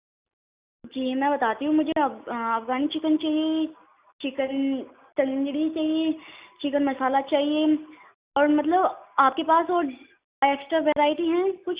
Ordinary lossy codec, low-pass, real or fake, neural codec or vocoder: Opus, 64 kbps; 3.6 kHz; real; none